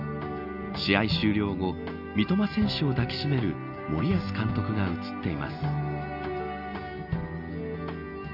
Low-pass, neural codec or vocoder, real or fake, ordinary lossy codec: 5.4 kHz; none; real; none